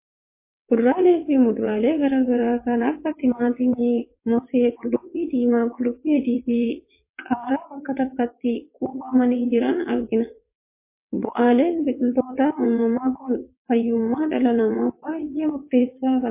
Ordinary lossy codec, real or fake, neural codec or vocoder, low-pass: MP3, 24 kbps; fake; vocoder, 22.05 kHz, 80 mel bands, WaveNeXt; 3.6 kHz